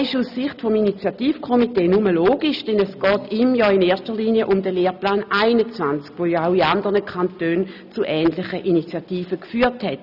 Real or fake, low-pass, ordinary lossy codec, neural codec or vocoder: real; 5.4 kHz; none; none